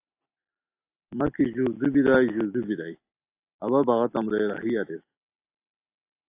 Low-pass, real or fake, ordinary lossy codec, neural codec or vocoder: 3.6 kHz; real; AAC, 24 kbps; none